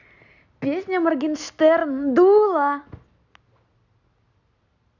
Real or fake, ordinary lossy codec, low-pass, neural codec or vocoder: real; none; 7.2 kHz; none